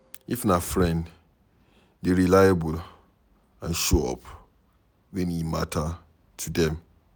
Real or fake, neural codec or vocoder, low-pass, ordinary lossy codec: real; none; none; none